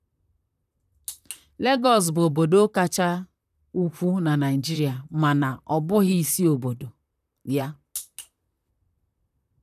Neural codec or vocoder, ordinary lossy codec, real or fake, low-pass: vocoder, 44.1 kHz, 128 mel bands, Pupu-Vocoder; none; fake; 14.4 kHz